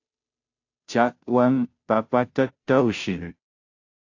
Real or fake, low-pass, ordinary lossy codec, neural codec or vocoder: fake; 7.2 kHz; AAC, 48 kbps; codec, 16 kHz, 0.5 kbps, FunCodec, trained on Chinese and English, 25 frames a second